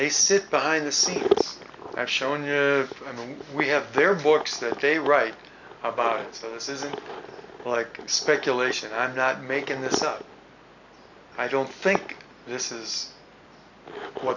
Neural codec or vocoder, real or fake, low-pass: none; real; 7.2 kHz